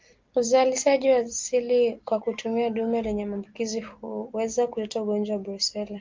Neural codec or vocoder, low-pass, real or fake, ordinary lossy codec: none; 7.2 kHz; real; Opus, 32 kbps